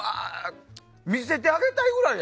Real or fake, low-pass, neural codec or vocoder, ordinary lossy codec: real; none; none; none